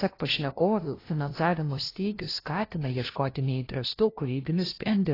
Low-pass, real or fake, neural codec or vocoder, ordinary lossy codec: 5.4 kHz; fake; codec, 16 kHz, 0.5 kbps, FunCodec, trained on LibriTTS, 25 frames a second; AAC, 24 kbps